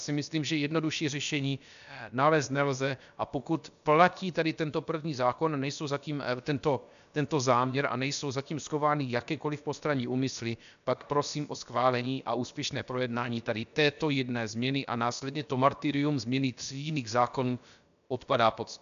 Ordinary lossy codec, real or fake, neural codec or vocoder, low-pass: MP3, 96 kbps; fake; codec, 16 kHz, about 1 kbps, DyCAST, with the encoder's durations; 7.2 kHz